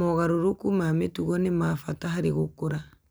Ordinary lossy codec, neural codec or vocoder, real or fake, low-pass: none; none; real; none